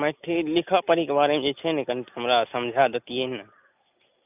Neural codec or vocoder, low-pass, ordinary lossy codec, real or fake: none; 3.6 kHz; none; real